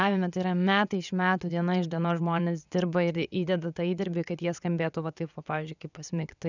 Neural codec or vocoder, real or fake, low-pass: vocoder, 22.05 kHz, 80 mel bands, WaveNeXt; fake; 7.2 kHz